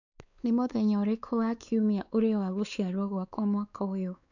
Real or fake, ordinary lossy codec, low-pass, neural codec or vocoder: fake; none; 7.2 kHz; codec, 16 kHz, 4 kbps, X-Codec, WavLM features, trained on Multilingual LibriSpeech